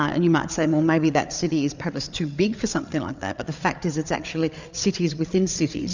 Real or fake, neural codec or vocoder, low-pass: fake; codec, 16 kHz, 8 kbps, FreqCodec, larger model; 7.2 kHz